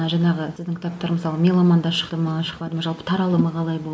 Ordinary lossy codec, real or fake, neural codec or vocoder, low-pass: none; real; none; none